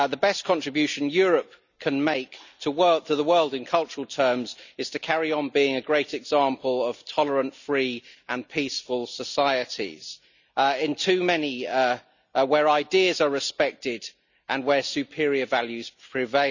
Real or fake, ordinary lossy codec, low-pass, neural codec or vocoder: real; none; 7.2 kHz; none